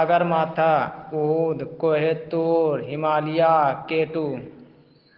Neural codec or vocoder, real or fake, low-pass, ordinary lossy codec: none; real; 5.4 kHz; Opus, 16 kbps